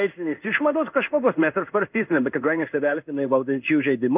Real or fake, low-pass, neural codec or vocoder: fake; 3.6 kHz; codec, 16 kHz in and 24 kHz out, 1 kbps, XY-Tokenizer